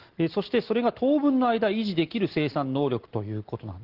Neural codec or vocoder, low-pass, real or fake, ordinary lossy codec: none; 5.4 kHz; real; Opus, 16 kbps